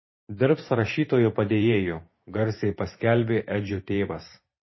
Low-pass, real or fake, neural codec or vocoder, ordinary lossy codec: 7.2 kHz; fake; vocoder, 44.1 kHz, 128 mel bands every 512 samples, BigVGAN v2; MP3, 24 kbps